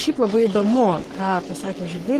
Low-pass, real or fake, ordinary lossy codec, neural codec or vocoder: 14.4 kHz; fake; Opus, 24 kbps; codec, 44.1 kHz, 3.4 kbps, Pupu-Codec